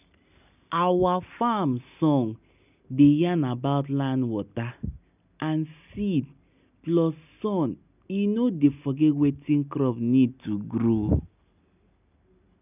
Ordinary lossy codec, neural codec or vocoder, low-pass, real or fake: none; none; 3.6 kHz; real